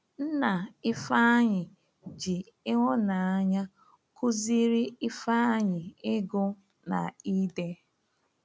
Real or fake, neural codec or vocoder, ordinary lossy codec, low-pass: real; none; none; none